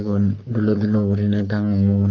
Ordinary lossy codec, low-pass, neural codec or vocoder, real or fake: Opus, 32 kbps; 7.2 kHz; codec, 44.1 kHz, 3.4 kbps, Pupu-Codec; fake